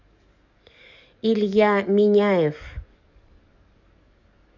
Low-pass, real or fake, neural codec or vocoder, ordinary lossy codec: 7.2 kHz; fake; codec, 44.1 kHz, 7.8 kbps, DAC; none